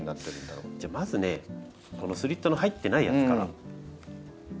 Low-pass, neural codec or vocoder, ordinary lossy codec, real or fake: none; none; none; real